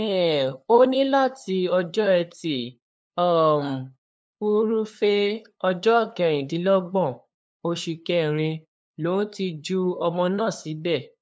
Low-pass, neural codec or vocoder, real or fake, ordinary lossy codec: none; codec, 16 kHz, 2 kbps, FunCodec, trained on LibriTTS, 25 frames a second; fake; none